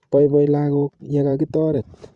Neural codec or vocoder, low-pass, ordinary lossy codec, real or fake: vocoder, 44.1 kHz, 128 mel bands every 512 samples, BigVGAN v2; 10.8 kHz; Opus, 64 kbps; fake